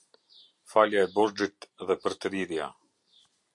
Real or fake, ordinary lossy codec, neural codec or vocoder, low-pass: fake; MP3, 48 kbps; vocoder, 44.1 kHz, 128 mel bands every 256 samples, BigVGAN v2; 10.8 kHz